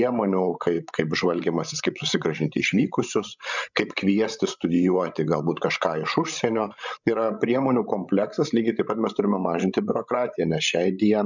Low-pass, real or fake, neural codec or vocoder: 7.2 kHz; fake; codec, 16 kHz, 16 kbps, FreqCodec, larger model